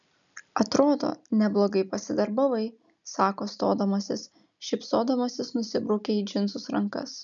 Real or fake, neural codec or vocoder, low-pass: real; none; 7.2 kHz